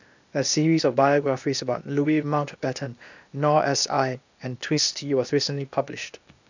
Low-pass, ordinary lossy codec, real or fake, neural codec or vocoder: 7.2 kHz; none; fake; codec, 16 kHz, 0.8 kbps, ZipCodec